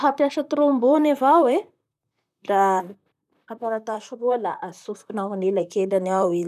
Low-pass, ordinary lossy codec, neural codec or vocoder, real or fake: 14.4 kHz; none; vocoder, 44.1 kHz, 128 mel bands, Pupu-Vocoder; fake